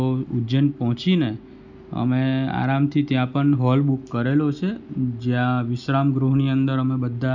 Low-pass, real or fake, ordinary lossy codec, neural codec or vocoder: 7.2 kHz; real; none; none